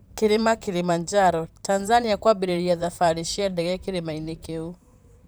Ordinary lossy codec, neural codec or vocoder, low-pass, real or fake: none; vocoder, 44.1 kHz, 128 mel bands, Pupu-Vocoder; none; fake